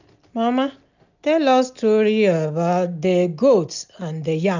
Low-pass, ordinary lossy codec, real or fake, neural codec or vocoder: 7.2 kHz; none; real; none